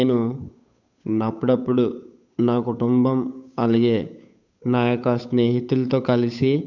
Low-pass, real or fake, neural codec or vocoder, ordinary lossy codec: 7.2 kHz; fake; codec, 16 kHz, 8 kbps, FunCodec, trained on Chinese and English, 25 frames a second; none